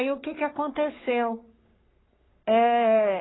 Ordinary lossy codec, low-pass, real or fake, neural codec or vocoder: AAC, 16 kbps; 7.2 kHz; fake; codec, 44.1 kHz, 3.4 kbps, Pupu-Codec